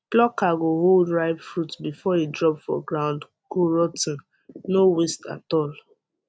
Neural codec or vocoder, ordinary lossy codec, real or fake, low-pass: none; none; real; none